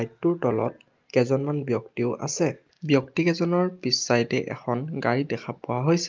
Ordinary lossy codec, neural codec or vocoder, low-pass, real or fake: Opus, 32 kbps; none; 7.2 kHz; real